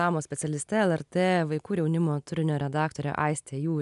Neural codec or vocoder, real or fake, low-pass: none; real; 10.8 kHz